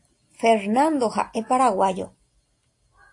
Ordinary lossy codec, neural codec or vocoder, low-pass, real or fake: AAC, 48 kbps; none; 10.8 kHz; real